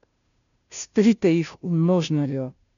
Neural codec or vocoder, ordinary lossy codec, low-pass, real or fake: codec, 16 kHz, 0.5 kbps, FunCodec, trained on Chinese and English, 25 frames a second; none; 7.2 kHz; fake